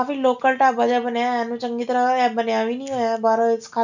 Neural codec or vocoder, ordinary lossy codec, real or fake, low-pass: none; none; real; 7.2 kHz